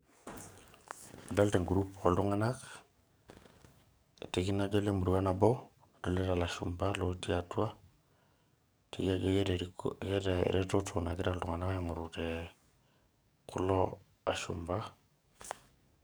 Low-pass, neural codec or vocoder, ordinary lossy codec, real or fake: none; codec, 44.1 kHz, 7.8 kbps, DAC; none; fake